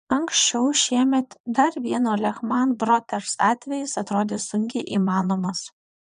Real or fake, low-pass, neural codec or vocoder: fake; 9.9 kHz; vocoder, 22.05 kHz, 80 mel bands, Vocos